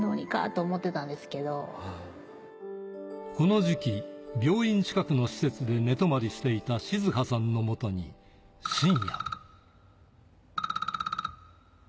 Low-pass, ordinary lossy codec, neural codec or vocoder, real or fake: none; none; none; real